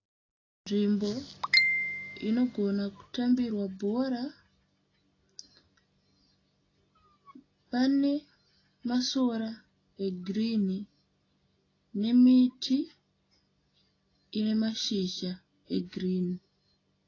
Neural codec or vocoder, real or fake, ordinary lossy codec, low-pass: none; real; AAC, 32 kbps; 7.2 kHz